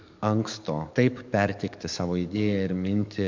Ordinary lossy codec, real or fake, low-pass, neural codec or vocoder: MP3, 64 kbps; fake; 7.2 kHz; codec, 44.1 kHz, 7.8 kbps, DAC